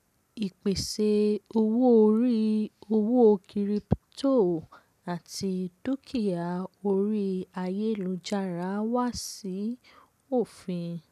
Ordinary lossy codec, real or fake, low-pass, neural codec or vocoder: none; real; 14.4 kHz; none